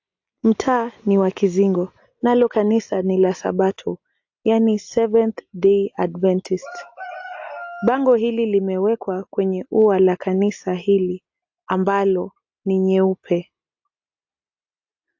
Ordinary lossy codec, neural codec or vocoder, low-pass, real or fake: AAC, 48 kbps; none; 7.2 kHz; real